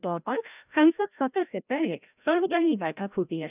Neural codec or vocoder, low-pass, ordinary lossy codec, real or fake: codec, 16 kHz, 0.5 kbps, FreqCodec, larger model; 3.6 kHz; none; fake